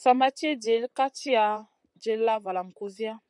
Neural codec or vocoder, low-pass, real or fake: vocoder, 44.1 kHz, 128 mel bands, Pupu-Vocoder; 10.8 kHz; fake